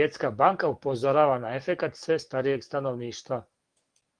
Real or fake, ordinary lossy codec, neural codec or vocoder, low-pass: fake; Opus, 24 kbps; codec, 44.1 kHz, 7.8 kbps, DAC; 9.9 kHz